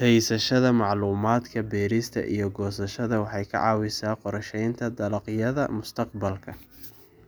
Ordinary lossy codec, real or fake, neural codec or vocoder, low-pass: none; real; none; none